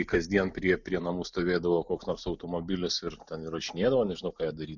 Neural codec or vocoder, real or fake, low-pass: none; real; 7.2 kHz